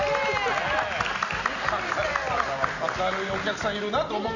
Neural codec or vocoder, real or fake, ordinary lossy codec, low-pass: none; real; none; 7.2 kHz